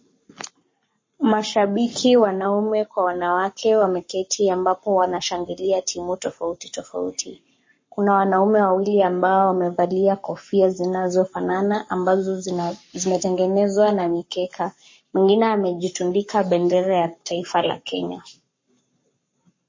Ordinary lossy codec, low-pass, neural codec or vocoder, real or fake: MP3, 32 kbps; 7.2 kHz; codec, 44.1 kHz, 7.8 kbps, Pupu-Codec; fake